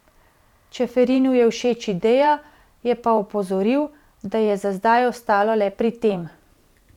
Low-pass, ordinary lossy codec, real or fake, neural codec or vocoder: 19.8 kHz; none; fake; vocoder, 44.1 kHz, 128 mel bands every 512 samples, BigVGAN v2